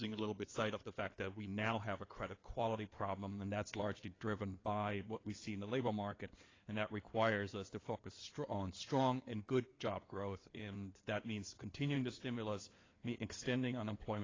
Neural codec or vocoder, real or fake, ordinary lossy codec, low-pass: codec, 16 kHz in and 24 kHz out, 2.2 kbps, FireRedTTS-2 codec; fake; AAC, 32 kbps; 7.2 kHz